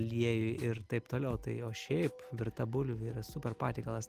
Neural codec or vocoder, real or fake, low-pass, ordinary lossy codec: none; real; 14.4 kHz; Opus, 24 kbps